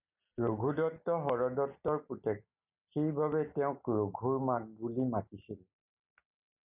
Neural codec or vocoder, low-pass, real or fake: none; 3.6 kHz; real